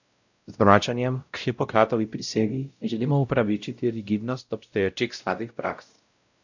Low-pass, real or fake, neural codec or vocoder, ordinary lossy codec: 7.2 kHz; fake; codec, 16 kHz, 0.5 kbps, X-Codec, WavLM features, trained on Multilingual LibriSpeech; none